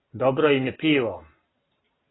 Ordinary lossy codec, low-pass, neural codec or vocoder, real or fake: AAC, 16 kbps; 7.2 kHz; none; real